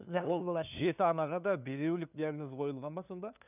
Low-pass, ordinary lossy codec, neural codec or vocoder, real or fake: 3.6 kHz; none; codec, 16 kHz, 2 kbps, FunCodec, trained on LibriTTS, 25 frames a second; fake